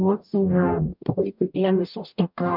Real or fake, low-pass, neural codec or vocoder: fake; 5.4 kHz; codec, 44.1 kHz, 0.9 kbps, DAC